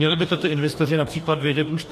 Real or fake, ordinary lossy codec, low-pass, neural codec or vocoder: fake; MP3, 64 kbps; 14.4 kHz; codec, 44.1 kHz, 2.6 kbps, DAC